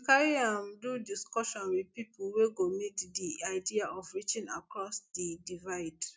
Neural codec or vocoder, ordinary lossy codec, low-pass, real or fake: none; none; none; real